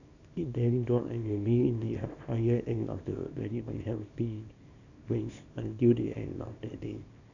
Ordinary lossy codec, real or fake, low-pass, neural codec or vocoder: none; fake; 7.2 kHz; codec, 24 kHz, 0.9 kbps, WavTokenizer, small release